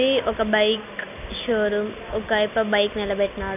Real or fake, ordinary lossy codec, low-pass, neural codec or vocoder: real; none; 3.6 kHz; none